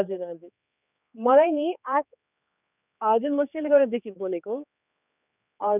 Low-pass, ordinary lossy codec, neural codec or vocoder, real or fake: 3.6 kHz; none; codec, 16 kHz, 4 kbps, X-Codec, HuBERT features, trained on general audio; fake